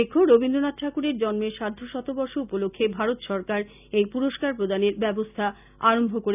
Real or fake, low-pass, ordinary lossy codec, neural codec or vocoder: real; 3.6 kHz; none; none